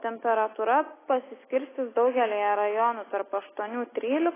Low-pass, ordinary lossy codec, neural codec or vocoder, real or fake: 3.6 kHz; AAC, 16 kbps; none; real